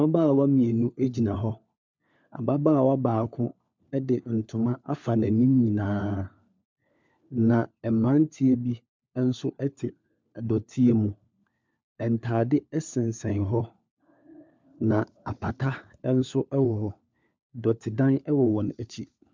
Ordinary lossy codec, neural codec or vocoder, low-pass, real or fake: MP3, 64 kbps; codec, 16 kHz, 4 kbps, FunCodec, trained on LibriTTS, 50 frames a second; 7.2 kHz; fake